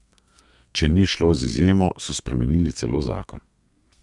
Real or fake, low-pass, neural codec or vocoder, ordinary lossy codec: fake; 10.8 kHz; codec, 32 kHz, 1.9 kbps, SNAC; none